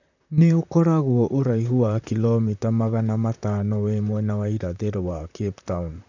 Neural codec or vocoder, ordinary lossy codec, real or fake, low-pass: vocoder, 44.1 kHz, 128 mel bands, Pupu-Vocoder; none; fake; 7.2 kHz